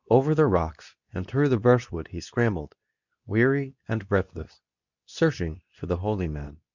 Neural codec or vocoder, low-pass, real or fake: codec, 24 kHz, 0.9 kbps, WavTokenizer, medium speech release version 2; 7.2 kHz; fake